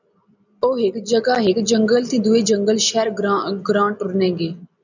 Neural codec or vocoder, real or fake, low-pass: none; real; 7.2 kHz